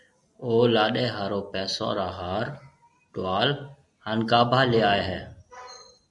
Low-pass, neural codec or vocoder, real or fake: 10.8 kHz; none; real